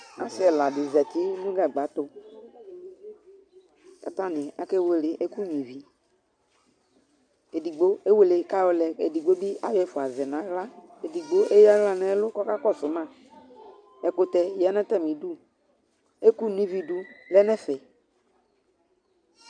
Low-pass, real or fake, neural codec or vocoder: 9.9 kHz; real; none